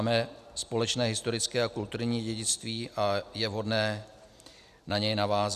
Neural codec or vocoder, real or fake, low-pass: none; real; 14.4 kHz